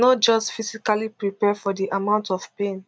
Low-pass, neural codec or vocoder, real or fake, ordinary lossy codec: none; none; real; none